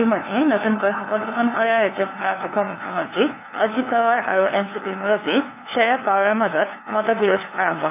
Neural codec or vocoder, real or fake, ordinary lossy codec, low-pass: codec, 16 kHz, 2 kbps, FunCodec, trained on LibriTTS, 25 frames a second; fake; none; 3.6 kHz